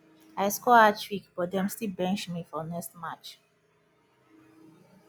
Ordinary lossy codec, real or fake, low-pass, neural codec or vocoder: none; real; none; none